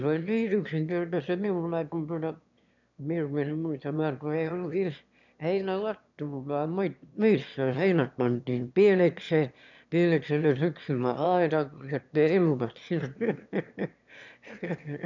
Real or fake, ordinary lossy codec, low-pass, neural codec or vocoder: fake; none; 7.2 kHz; autoencoder, 22.05 kHz, a latent of 192 numbers a frame, VITS, trained on one speaker